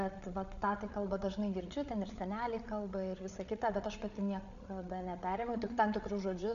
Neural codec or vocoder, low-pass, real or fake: codec, 16 kHz, 8 kbps, FreqCodec, larger model; 7.2 kHz; fake